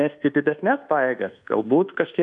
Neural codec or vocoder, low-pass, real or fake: codec, 24 kHz, 1.2 kbps, DualCodec; 10.8 kHz; fake